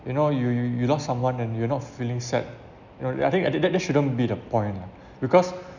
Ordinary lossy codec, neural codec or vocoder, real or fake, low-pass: none; none; real; 7.2 kHz